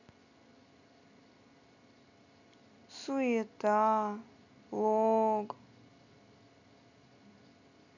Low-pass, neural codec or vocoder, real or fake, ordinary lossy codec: 7.2 kHz; none; real; none